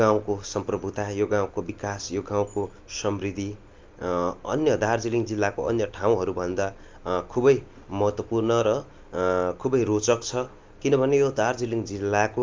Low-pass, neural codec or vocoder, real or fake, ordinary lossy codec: 7.2 kHz; none; real; Opus, 24 kbps